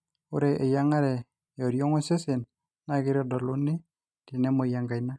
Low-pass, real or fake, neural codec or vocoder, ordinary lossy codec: none; real; none; none